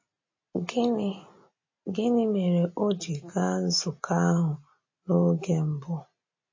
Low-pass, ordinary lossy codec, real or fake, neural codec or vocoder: 7.2 kHz; MP3, 32 kbps; real; none